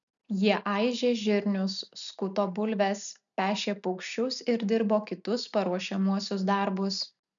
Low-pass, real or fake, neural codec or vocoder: 7.2 kHz; real; none